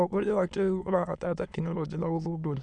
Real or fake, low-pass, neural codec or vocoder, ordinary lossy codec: fake; 9.9 kHz; autoencoder, 22.05 kHz, a latent of 192 numbers a frame, VITS, trained on many speakers; none